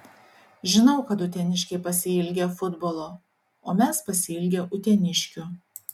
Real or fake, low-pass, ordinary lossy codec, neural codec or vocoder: real; 19.8 kHz; MP3, 96 kbps; none